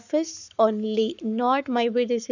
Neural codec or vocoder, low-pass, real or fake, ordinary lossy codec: codec, 44.1 kHz, 7.8 kbps, Pupu-Codec; 7.2 kHz; fake; none